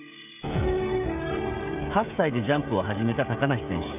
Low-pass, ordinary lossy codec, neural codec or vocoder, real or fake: 3.6 kHz; none; codec, 16 kHz, 16 kbps, FreqCodec, smaller model; fake